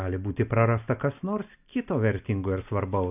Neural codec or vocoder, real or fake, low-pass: none; real; 3.6 kHz